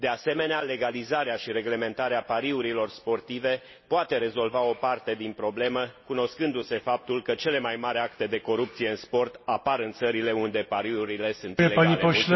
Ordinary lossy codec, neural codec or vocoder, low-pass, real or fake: MP3, 24 kbps; none; 7.2 kHz; real